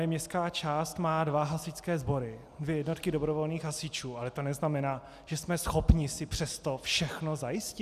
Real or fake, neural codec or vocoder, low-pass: real; none; 14.4 kHz